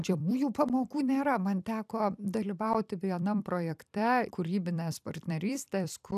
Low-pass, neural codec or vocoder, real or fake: 14.4 kHz; vocoder, 44.1 kHz, 128 mel bands every 256 samples, BigVGAN v2; fake